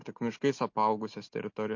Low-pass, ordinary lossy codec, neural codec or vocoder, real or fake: 7.2 kHz; MP3, 48 kbps; none; real